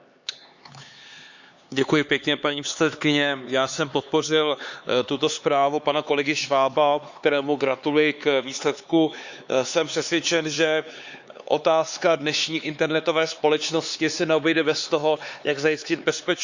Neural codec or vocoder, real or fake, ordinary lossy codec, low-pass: codec, 16 kHz, 4 kbps, X-Codec, HuBERT features, trained on LibriSpeech; fake; Opus, 64 kbps; 7.2 kHz